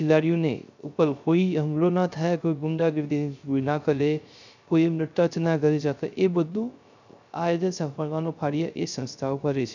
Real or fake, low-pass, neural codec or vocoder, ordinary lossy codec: fake; 7.2 kHz; codec, 16 kHz, 0.3 kbps, FocalCodec; none